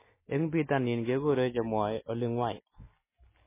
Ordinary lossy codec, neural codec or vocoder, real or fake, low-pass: MP3, 16 kbps; none; real; 3.6 kHz